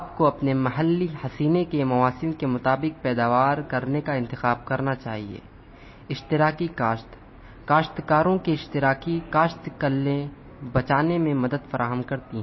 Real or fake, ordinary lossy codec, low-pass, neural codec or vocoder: real; MP3, 24 kbps; 7.2 kHz; none